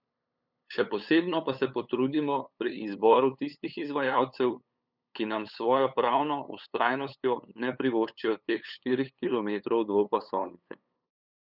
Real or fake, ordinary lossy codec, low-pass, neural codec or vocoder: fake; none; 5.4 kHz; codec, 16 kHz, 8 kbps, FunCodec, trained on LibriTTS, 25 frames a second